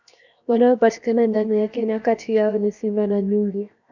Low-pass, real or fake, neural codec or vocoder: 7.2 kHz; fake; codec, 16 kHz, 0.7 kbps, FocalCodec